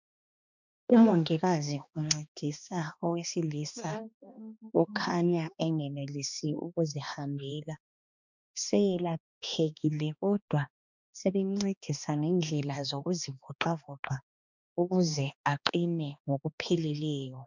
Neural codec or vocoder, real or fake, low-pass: codec, 16 kHz, 2 kbps, X-Codec, HuBERT features, trained on balanced general audio; fake; 7.2 kHz